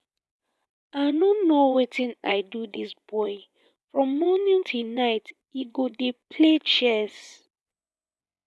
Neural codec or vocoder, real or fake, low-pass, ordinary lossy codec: vocoder, 24 kHz, 100 mel bands, Vocos; fake; none; none